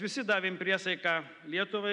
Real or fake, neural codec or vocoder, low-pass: real; none; 10.8 kHz